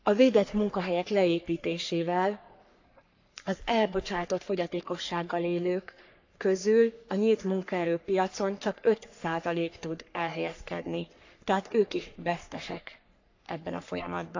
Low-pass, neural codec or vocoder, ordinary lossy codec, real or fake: 7.2 kHz; codec, 44.1 kHz, 3.4 kbps, Pupu-Codec; AAC, 48 kbps; fake